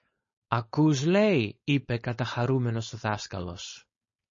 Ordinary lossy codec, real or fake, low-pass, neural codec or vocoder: MP3, 32 kbps; fake; 7.2 kHz; codec, 16 kHz, 4.8 kbps, FACodec